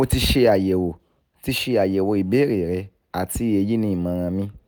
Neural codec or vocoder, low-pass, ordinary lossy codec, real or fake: none; none; none; real